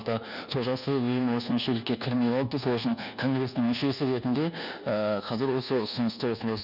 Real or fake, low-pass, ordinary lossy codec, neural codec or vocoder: fake; 5.4 kHz; none; codec, 24 kHz, 1.2 kbps, DualCodec